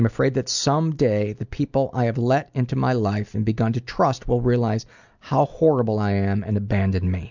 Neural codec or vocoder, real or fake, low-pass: none; real; 7.2 kHz